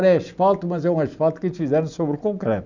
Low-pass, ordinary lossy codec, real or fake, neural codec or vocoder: 7.2 kHz; none; real; none